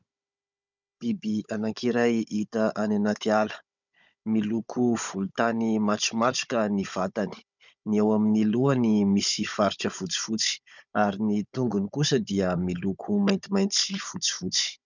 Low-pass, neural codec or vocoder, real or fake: 7.2 kHz; codec, 16 kHz, 16 kbps, FunCodec, trained on Chinese and English, 50 frames a second; fake